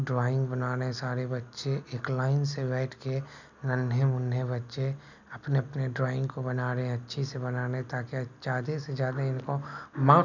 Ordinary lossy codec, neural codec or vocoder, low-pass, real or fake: none; none; 7.2 kHz; real